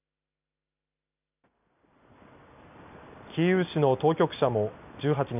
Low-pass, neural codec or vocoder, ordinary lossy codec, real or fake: 3.6 kHz; none; none; real